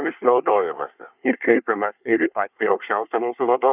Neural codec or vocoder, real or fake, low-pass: codec, 24 kHz, 1 kbps, SNAC; fake; 3.6 kHz